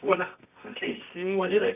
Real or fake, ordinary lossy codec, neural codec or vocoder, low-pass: fake; none; codec, 24 kHz, 0.9 kbps, WavTokenizer, medium music audio release; 3.6 kHz